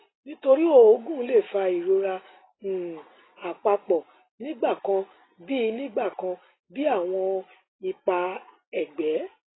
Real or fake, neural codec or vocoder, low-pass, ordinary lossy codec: real; none; 7.2 kHz; AAC, 16 kbps